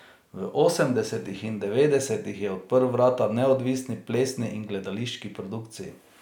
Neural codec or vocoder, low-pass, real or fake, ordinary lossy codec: none; 19.8 kHz; real; none